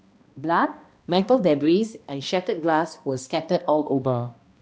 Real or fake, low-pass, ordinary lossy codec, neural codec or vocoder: fake; none; none; codec, 16 kHz, 1 kbps, X-Codec, HuBERT features, trained on balanced general audio